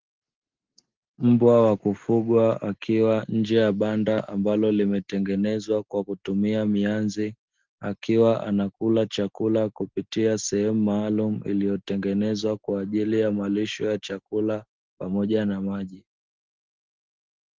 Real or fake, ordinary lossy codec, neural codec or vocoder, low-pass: real; Opus, 16 kbps; none; 7.2 kHz